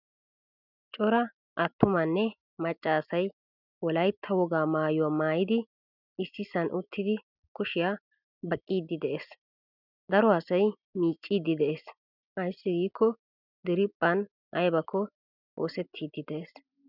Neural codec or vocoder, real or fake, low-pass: none; real; 5.4 kHz